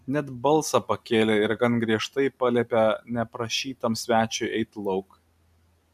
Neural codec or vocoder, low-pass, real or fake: none; 14.4 kHz; real